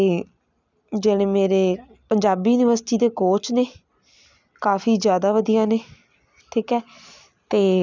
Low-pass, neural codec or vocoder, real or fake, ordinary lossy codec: 7.2 kHz; none; real; none